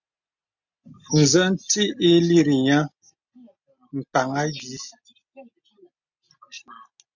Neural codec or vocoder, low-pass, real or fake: none; 7.2 kHz; real